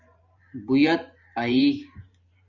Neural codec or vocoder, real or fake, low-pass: vocoder, 44.1 kHz, 128 mel bands every 512 samples, BigVGAN v2; fake; 7.2 kHz